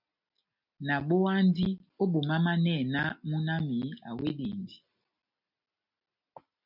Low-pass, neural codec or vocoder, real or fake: 5.4 kHz; none; real